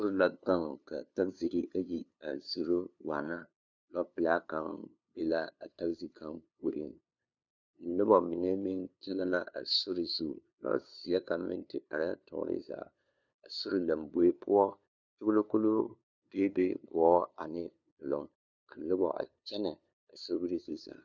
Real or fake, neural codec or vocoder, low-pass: fake; codec, 16 kHz, 2 kbps, FunCodec, trained on LibriTTS, 25 frames a second; 7.2 kHz